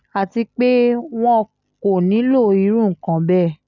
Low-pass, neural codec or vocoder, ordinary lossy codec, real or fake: 7.2 kHz; none; none; real